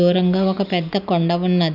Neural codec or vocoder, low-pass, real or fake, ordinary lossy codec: none; 5.4 kHz; real; none